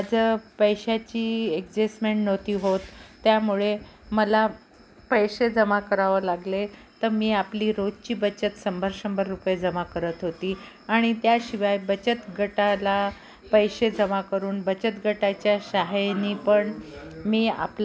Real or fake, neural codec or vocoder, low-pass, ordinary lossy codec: real; none; none; none